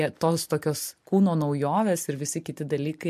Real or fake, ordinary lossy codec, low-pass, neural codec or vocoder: fake; MP3, 64 kbps; 14.4 kHz; vocoder, 44.1 kHz, 128 mel bands every 256 samples, BigVGAN v2